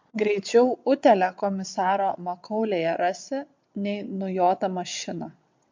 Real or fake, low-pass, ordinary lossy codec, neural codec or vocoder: fake; 7.2 kHz; MP3, 48 kbps; vocoder, 22.05 kHz, 80 mel bands, WaveNeXt